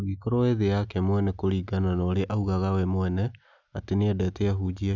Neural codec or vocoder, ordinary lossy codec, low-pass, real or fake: none; none; 7.2 kHz; real